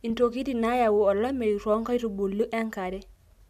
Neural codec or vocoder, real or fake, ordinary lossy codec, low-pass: none; real; MP3, 96 kbps; 14.4 kHz